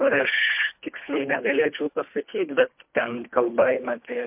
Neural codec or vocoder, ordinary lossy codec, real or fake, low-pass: codec, 24 kHz, 1.5 kbps, HILCodec; MP3, 32 kbps; fake; 3.6 kHz